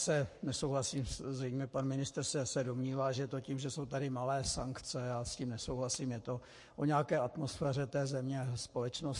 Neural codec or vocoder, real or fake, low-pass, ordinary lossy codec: codec, 44.1 kHz, 7.8 kbps, Pupu-Codec; fake; 10.8 kHz; MP3, 48 kbps